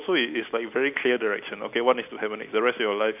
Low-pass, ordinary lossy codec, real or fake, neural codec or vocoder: 3.6 kHz; none; real; none